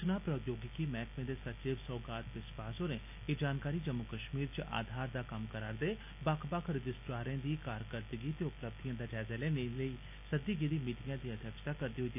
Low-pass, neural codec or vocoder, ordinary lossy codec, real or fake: 3.6 kHz; none; none; real